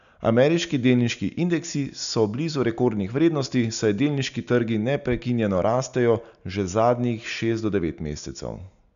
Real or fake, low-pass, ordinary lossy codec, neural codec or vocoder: real; 7.2 kHz; none; none